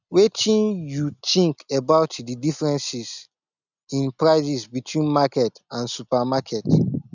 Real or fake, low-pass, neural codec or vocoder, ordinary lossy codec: real; 7.2 kHz; none; none